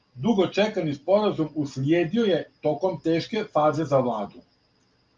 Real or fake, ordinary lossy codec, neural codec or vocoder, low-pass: real; Opus, 32 kbps; none; 7.2 kHz